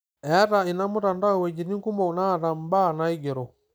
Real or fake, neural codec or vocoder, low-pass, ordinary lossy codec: real; none; none; none